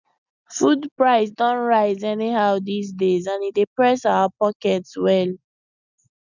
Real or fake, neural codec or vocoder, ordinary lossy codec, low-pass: real; none; none; 7.2 kHz